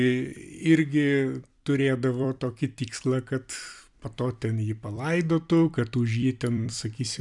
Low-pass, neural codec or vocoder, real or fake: 10.8 kHz; vocoder, 44.1 kHz, 128 mel bands every 256 samples, BigVGAN v2; fake